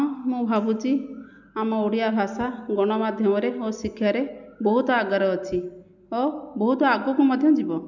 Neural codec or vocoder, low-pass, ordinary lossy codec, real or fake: none; 7.2 kHz; none; real